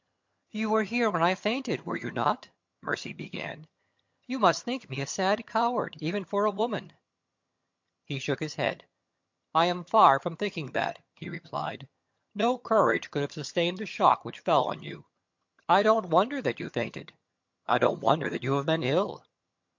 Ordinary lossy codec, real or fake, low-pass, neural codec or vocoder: MP3, 48 kbps; fake; 7.2 kHz; vocoder, 22.05 kHz, 80 mel bands, HiFi-GAN